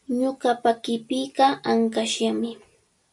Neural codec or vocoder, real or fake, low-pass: none; real; 10.8 kHz